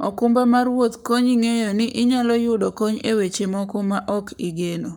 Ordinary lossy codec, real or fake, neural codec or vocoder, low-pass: none; fake; codec, 44.1 kHz, 7.8 kbps, Pupu-Codec; none